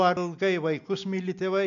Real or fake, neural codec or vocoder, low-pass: real; none; 7.2 kHz